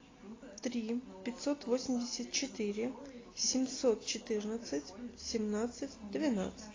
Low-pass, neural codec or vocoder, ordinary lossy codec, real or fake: 7.2 kHz; none; AAC, 32 kbps; real